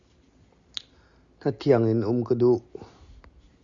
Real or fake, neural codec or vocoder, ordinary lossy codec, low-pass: real; none; Opus, 64 kbps; 7.2 kHz